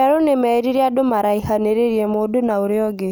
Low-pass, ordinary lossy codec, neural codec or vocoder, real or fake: none; none; none; real